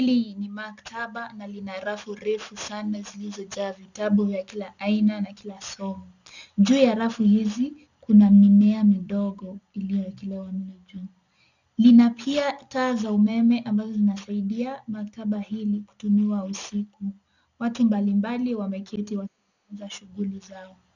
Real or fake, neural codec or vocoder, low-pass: real; none; 7.2 kHz